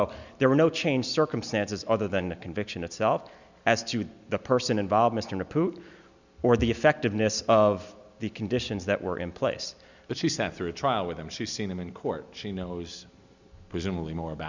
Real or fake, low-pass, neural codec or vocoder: real; 7.2 kHz; none